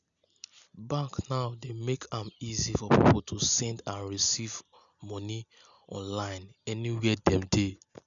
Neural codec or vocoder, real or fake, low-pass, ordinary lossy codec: none; real; 7.2 kHz; none